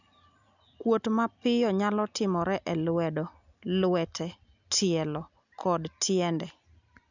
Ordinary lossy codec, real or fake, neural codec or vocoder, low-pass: none; real; none; 7.2 kHz